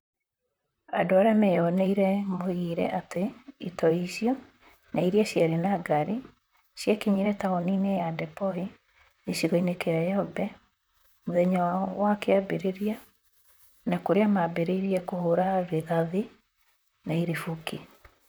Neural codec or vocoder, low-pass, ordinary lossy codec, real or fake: vocoder, 44.1 kHz, 128 mel bands, Pupu-Vocoder; none; none; fake